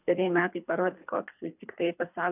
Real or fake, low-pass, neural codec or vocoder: fake; 3.6 kHz; codec, 24 kHz, 3 kbps, HILCodec